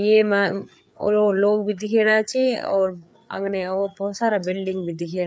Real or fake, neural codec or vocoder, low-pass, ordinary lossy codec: fake; codec, 16 kHz, 4 kbps, FreqCodec, larger model; none; none